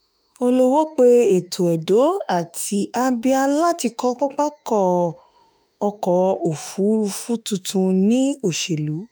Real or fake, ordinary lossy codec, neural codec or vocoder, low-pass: fake; none; autoencoder, 48 kHz, 32 numbers a frame, DAC-VAE, trained on Japanese speech; none